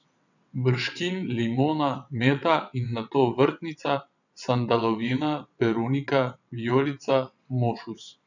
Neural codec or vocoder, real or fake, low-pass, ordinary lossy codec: vocoder, 22.05 kHz, 80 mel bands, WaveNeXt; fake; 7.2 kHz; none